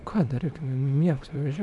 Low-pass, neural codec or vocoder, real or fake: 10.8 kHz; codec, 24 kHz, 0.9 kbps, WavTokenizer, small release; fake